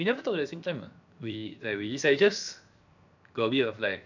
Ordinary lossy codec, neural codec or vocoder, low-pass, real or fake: none; codec, 16 kHz, 0.7 kbps, FocalCodec; 7.2 kHz; fake